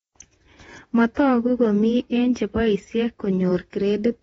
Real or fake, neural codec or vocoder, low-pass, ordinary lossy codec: fake; vocoder, 48 kHz, 128 mel bands, Vocos; 19.8 kHz; AAC, 24 kbps